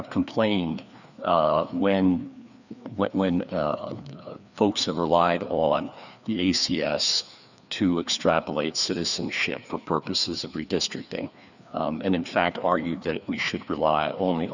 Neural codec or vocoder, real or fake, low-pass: codec, 16 kHz, 2 kbps, FreqCodec, larger model; fake; 7.2 kHz